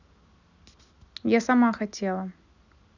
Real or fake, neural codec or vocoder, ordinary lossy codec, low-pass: real; none; none; 7.2 kHz